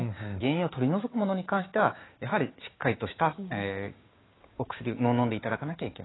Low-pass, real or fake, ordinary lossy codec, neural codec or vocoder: 7.2 kHz; fake; AAC, 16 kbps; codec, 16 kHz, 6 kbps, DAC